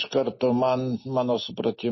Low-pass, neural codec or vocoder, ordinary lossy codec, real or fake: 7.2 kHz; none; MP3, 24 kbps; real